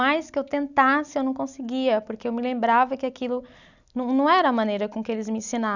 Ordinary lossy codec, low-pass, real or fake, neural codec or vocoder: none; 7.2 kHz; real; none